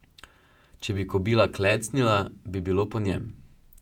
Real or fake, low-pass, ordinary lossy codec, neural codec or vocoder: fake; 19.8 kHz; none; vocoder, 48 kHz, 128 mel bands, Vocos